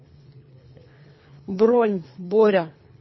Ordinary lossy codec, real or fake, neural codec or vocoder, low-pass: MP3, 24 kbps; fake; codec, 24 kHz, 3 kbps, HILCodec; 7.2 kHz